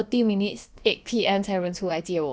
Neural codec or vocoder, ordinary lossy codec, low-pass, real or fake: codec, 16 kHz, about 1 kbps, DyCAST, with the encoder's durations; none; none; fake